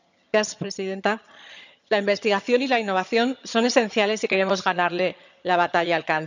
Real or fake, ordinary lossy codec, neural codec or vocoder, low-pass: fake; none; vocoder, 22.05 kHz, 80 mel bands, HiFi-GAN; 7.2 kHz